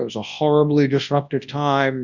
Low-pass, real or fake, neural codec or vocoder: 7.2 kHz; fake; codec, 24 kHz, 0.9 kbps, WavTokenizer, large speech release